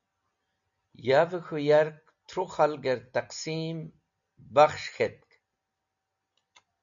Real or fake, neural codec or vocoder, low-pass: real; none; 7.2 kHz